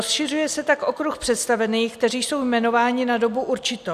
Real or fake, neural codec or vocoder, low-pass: real; none; 14.4 kHz